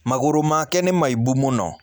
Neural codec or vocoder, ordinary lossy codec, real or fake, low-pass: none; none; real; none